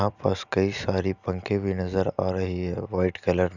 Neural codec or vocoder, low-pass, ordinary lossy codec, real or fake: none; 7.2 kHz; none; real